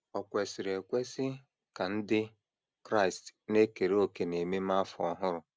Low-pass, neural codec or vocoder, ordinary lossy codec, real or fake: none; none; none; real